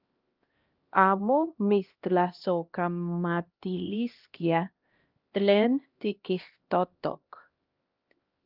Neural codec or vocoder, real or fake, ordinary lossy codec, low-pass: codec, 16 kHz, 1 kbps, X-Codec, HuBERT features, trained on LibriSpeech; fake; Opus, 32 kbps; 5.4 kHz